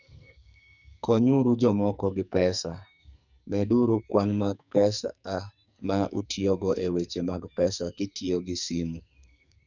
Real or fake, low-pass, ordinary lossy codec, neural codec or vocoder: fake; 7.2 kHz; none; codec, 44.1 kHz, 2.6 kbps, SNAC